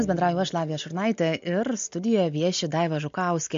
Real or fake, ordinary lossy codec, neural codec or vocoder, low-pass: real; MP3, 48 kbps; none; 7.2 kHz